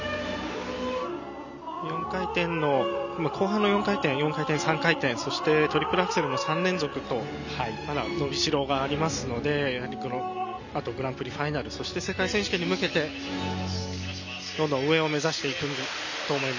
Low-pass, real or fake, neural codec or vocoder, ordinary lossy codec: 7.2 kHz; real; none; none